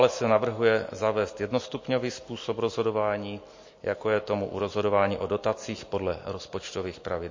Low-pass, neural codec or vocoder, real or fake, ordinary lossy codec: 7.2 kHz; none; real; MP3, 32 kbps